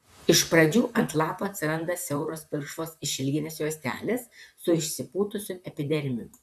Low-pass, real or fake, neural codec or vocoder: 14.4 kHz; fake; vocoder, 44.1 kHz, 128 mel bands, Pupu-Vocoder